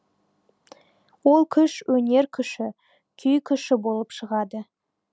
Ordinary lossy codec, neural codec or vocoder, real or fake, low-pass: none; none; real; none